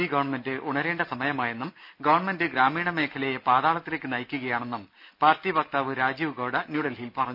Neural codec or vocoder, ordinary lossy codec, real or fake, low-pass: none; none; real; 5.4 kHz